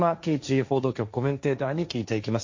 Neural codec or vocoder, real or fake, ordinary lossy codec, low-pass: codec, 16 kHz, 1.1 kbps, Voila-Tokenizer; fake; MP3, 48 kbps; 7.2 kHz